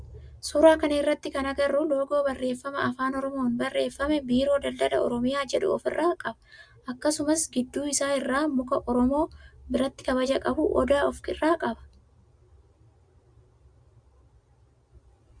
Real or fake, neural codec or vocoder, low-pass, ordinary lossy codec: real; none; 9.9 kHz; Opus, 64 kbps